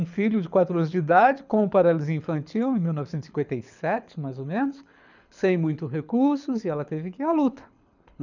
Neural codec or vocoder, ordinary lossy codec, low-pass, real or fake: codec, 24 kHz, 6 kbps, HILCodec; none; 7.2 kHz; fake